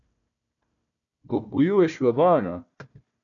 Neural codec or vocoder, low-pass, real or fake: codec, 16 kHz, 1 kbps, FunCodec, trained on Chinese and English, 50 frames a second; 7.2 kHz; fake